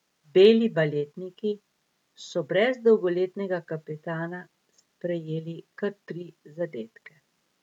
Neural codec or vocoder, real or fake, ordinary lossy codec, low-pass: none; real; none; 19.8 kHz